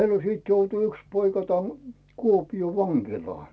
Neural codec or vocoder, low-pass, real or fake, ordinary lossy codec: none; none; real; none